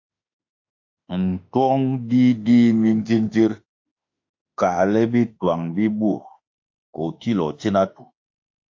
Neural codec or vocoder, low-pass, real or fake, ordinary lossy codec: autoencoder, 48 kHz, 32 numbers a frame, DAC-VAE, trained on Japanese speech; 7.2 kHz; fake; AAC, 48 kbps